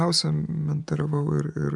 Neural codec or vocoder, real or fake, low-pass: vocoder, 44.1 kHz, 128 mel bands every 512 samples, BigVGAN v2; fake; 10.8 kHz